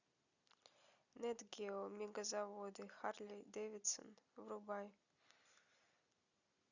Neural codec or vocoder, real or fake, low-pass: none; real; 7.2 kHz